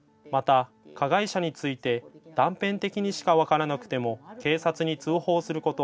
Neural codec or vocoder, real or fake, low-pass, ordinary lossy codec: none; real; none; none